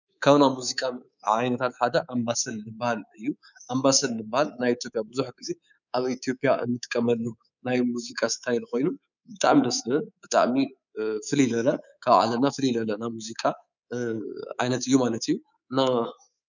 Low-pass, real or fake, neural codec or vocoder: 7.2 kHz; fake; codec, 24 kHz, 3.1 kbps, DualCodec